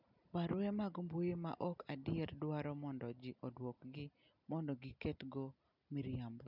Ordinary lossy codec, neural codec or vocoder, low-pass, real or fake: none; none; 5.4 kHz; real